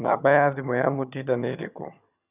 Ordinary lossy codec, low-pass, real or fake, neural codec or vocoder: none; 3.6 kHz; fake; vocoder, 22.05 kHz, 80 mel bands, HiFi-GAN